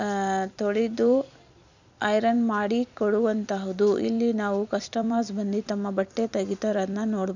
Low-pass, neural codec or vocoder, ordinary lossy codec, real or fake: 7.2 kHz; vocoder, 44.1 kHz, 128 mel bands every 256 samples, BigVGAN v2; none; fake